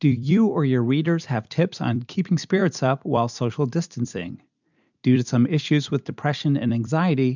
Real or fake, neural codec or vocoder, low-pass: fake; vocoder, 44.1 kHz, 128 mel bands every 256 samples, BigVGAN v2; 7.2 kHz